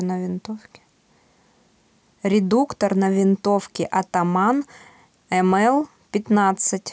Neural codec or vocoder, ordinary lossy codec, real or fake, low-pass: none; none; real; none